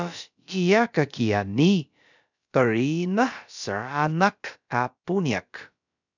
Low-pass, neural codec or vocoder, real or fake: 7.2 kHz; codec, 16 kHz, about 1 kbps, DyCAST, with the encoder's durations; fake